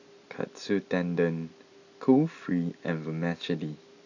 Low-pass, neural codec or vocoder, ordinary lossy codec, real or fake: 7.2 kHz; none; none; real